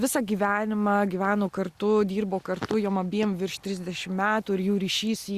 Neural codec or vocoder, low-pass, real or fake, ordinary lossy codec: none; 14.4 kHz; real; Opus, 64 kbps